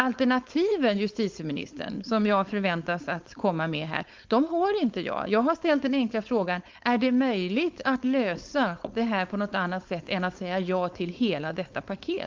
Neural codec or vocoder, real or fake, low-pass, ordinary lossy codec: codec, 16 kHz, 4.8 kbps, FACodec; fake; 7.2 kHz; Opus, 24 kbps